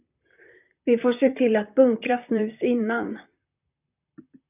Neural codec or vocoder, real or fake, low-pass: vocoder, 44.1 kHz, 128 mel bands, Pupu-Vocoder; fake; 3.6 kHz